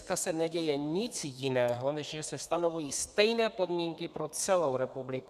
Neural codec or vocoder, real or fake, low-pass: codec, 32 kHz, 1.9 kbps, SNAC; fake; 14.4 kHz